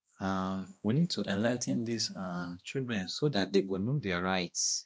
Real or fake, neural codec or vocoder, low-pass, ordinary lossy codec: fake; codec, 16 kHz, 1 kbps, X-Codec, HuBERT features, trained on balanced general audio; none; none